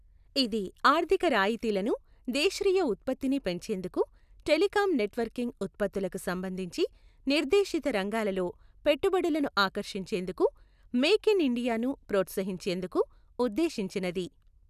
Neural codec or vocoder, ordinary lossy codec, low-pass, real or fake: none; none; 14.4 kHz; real